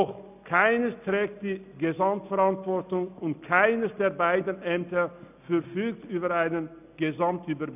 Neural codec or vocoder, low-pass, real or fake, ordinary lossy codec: none; 3.6 kHz; real; none